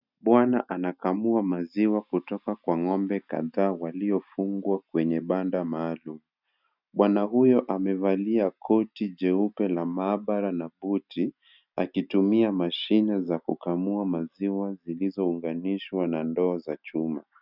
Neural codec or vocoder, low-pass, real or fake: none; 5.4 kHz; real